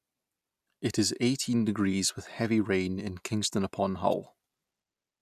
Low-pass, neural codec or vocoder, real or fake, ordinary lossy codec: 14.4 kHz; none; real; none